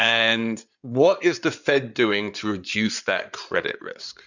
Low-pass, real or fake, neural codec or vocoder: 7.2 kHz; fake; codec, 16 kHz in and 24 kHz out, 2.2 kbps, FireRedTTS-2 codec